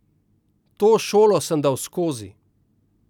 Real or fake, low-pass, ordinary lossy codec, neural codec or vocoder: real; 19.8 kHz; none; none